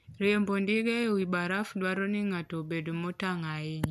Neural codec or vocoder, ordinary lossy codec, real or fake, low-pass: none; none; real; 14.4 kHz